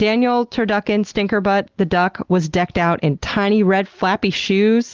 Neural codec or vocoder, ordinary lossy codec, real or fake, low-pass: none; Opus, 16 kbps; real; 7.2 kHz